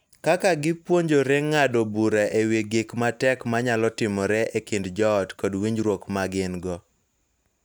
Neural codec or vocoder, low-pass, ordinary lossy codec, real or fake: none; none; none; real